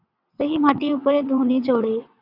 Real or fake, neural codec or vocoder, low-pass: fake; vocoder, 22.05 kHz, 80 mel bands, WaveNeXt; 5.4 kHz